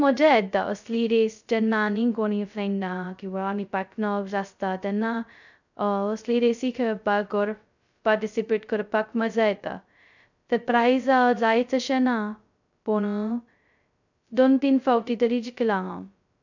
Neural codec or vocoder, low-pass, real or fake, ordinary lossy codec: codec, 16 kHz, 0.2 kbps, FocalCodec; 7.2 kHz; fake; none